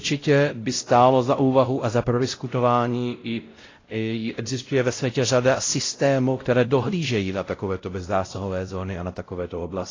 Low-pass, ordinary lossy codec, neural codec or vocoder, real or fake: 7.2 kHz; AAC, 32 kbps; codec, 16 kHz, 0.5 kbps, X-Codec, WavLM features, trained on Multilingual LibriSpeech; fake